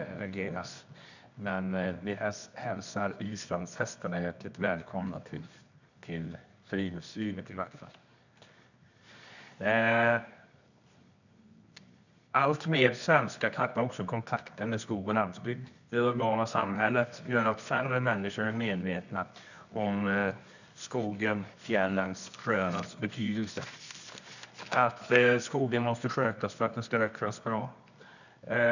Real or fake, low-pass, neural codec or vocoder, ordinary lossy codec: fake; 7.2 kHz; codec, 24 kHz, 0.9 kbps, WavTokenizer, medium music audio release; none